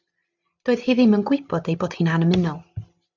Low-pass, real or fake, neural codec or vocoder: 7.2 kHz; real; none